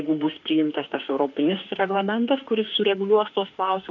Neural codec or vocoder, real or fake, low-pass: autoencoder, 48 kHz, 32 numbers a frame, DAC-VAE, trained on Japanese speech; fake; 7.2 kHz